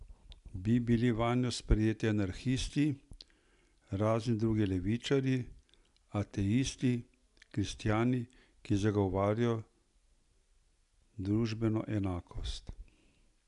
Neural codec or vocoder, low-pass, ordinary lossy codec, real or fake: none; 10.8 kHz; none; real